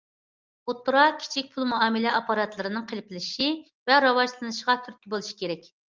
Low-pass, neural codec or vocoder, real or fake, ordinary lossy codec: 7.2 kHz; none; real; Opus, 32 kbps